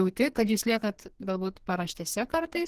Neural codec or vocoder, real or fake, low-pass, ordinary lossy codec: codec, 44.1 kHz, 2.6 kbps, SNAC; fake; 14.4 kHz; Opus, 16 kbps